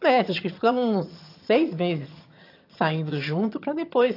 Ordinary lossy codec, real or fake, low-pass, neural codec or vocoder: AAC, 32 kbps; fake; 5.4 kHz; vocoder, 22.05 kHz, 80 mel bands, HiFi-GAN